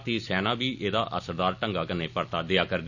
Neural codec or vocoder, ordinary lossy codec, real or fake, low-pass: none; none; real; 7.2 kHz